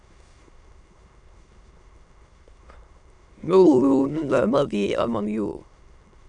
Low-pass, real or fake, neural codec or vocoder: 9.9 kHz; fake; autoencoder, 22.05 kHz, a latent of 192 numbers a frame, VITS, trained on many speakers